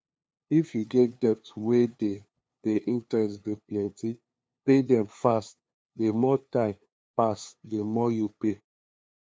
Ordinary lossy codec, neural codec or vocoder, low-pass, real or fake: none; codec, 16 kHz, 2 kbps, FunCodec, trained on LibriTTS, 25 frames a second; none; fake